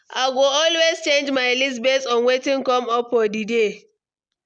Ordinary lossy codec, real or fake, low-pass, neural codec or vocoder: none; real; 9.9 kHz; none